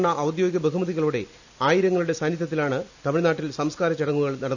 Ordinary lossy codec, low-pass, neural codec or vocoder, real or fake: none; 7.2 kHz; none; real